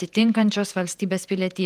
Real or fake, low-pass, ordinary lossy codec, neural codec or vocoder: fake; 14.4 kHz; Opus, 32 kbps; vocoder, 48 kHz, 128 mel bands, Vocos